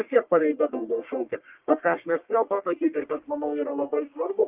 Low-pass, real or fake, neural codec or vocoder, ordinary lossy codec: 3.6 kHz; fake; codec, 44.1 kHz, 1.7 kbps, Pupu-Codec; Opus, 32 kbps